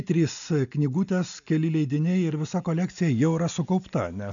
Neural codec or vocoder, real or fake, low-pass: none; real; 7.2 kHz